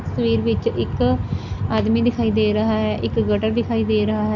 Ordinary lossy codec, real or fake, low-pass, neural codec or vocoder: none; real; 7.2 kHz; none